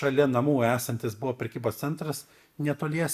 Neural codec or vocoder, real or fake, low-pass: none; real; 14.4 kHz